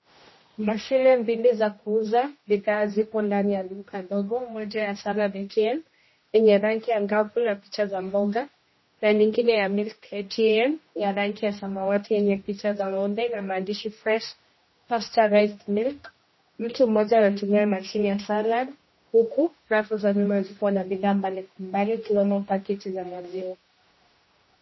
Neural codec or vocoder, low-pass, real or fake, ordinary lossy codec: codec, 16 kHz, 1 kbps, X-Codec, HuBERT features, trained on general audio; 7.2 kHz; fake; MP3, 24 kbps